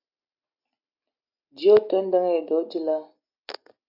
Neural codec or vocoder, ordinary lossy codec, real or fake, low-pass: none; AAC, 48 kbps; real; 5.4 kHz